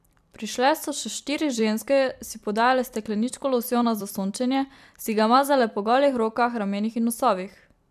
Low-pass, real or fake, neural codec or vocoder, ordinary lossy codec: 14.4 kHz; fake; vocoder, 44.1 kHz, 128 mel bands every 256 samples, BigVGAN v2; MP3, 96 kbps